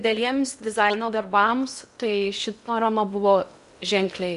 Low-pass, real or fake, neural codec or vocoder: 10.8 kHz; fake; codec, 16 kHz in and 24 kHz out, 0.8 kbps, FocalCodec, streaming, 65536 codes